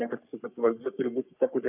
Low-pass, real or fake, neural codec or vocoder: 3.6 kHz; fake; codec, 44.1 kHz, 3.4 kbps, Pupu-Codec